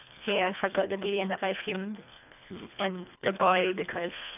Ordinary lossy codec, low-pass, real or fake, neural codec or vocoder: none; 3.6 kHz; fake; codec, 24 kHz, 1.5 kbps, HILCodec